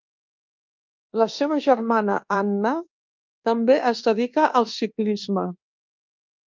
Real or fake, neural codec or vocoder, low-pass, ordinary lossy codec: fake; codec, 24 kHz, 1.2 kbps, DualCodec; 7.2 kHz; Opus, 24 kbps